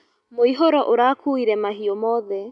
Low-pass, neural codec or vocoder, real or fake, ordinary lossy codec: 10.8 kHz; none; real; none